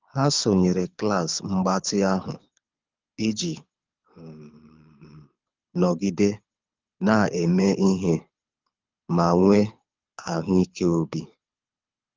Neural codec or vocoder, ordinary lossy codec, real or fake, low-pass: codec, 24 kHz, 6 kbps, HILCodec; Opus, 24 kbps; fake; 7.2 kHz